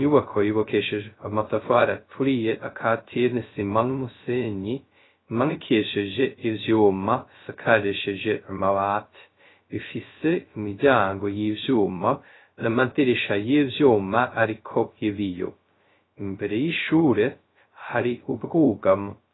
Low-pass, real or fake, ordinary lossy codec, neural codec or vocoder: 7.2 kHz; fake; AAC, 16 kbps; codec, 16 kHz, 0.2 kbps, FocalCodec